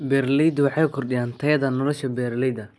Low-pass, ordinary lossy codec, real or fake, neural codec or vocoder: none; none; real; none